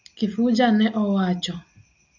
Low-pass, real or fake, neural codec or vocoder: 7.2 kHz; real; none